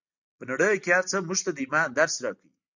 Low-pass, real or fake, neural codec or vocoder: 7.2 kHz; real; none